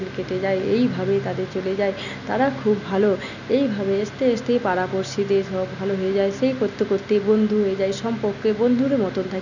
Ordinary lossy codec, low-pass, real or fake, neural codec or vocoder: none; 7.2 kHz; real; none